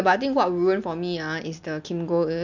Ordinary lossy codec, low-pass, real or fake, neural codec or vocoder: none; 7.2 kHz; real; none